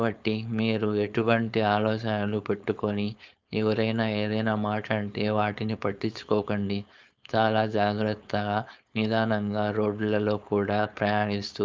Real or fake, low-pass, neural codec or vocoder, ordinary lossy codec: fake; 7.2 kHz; codec, 16 kHz, 4.8 kbps, FACodec; Opus, 24 kbps